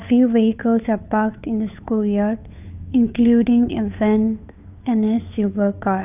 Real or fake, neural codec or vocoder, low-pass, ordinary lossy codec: fake; codec, 16 kHz, 2 kbps, FunCodec, trained on Chinese and English, 25 frames a second; 3.6 kHz; none